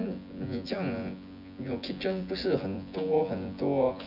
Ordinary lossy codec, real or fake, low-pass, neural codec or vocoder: none; fake; 5.4 kHz; vocoder, 24 kHz, 100 mel bands, Vocos